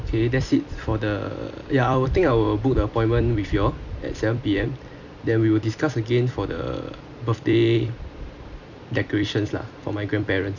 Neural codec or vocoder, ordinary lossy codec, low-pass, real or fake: vocoder, 44.1 kHz, 128 mel bands every 256 samples, BigVGAN v2; none; 7.2 kHz; fake